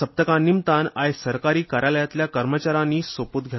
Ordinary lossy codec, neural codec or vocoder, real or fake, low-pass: MP3, 24 kbps; none; real; 7.2 kHz